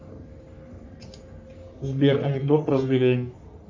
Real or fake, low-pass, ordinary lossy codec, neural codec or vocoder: fake; 7.2 kHz; MP3, 64 kbps; codec, 44.1 kHz, 3.4 kbps, Pupu-Codec